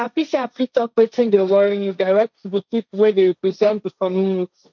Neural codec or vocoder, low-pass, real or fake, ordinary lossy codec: codec, 16 kHz, 1.1 kbps, Voila-Tokenizer; 7.2 kHz; fake; none